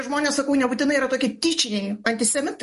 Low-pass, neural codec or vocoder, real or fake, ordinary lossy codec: 14.4 kHz; none; real; MP3, 48 kbps